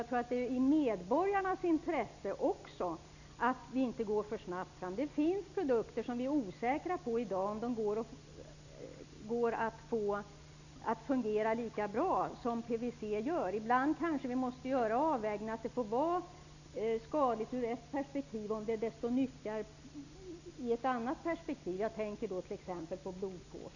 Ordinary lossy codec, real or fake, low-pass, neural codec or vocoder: none; real; 7.2 kHz; none